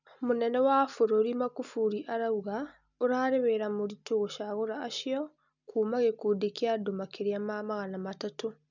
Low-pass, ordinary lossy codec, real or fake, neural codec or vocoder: 7.2 kHz; none; real; none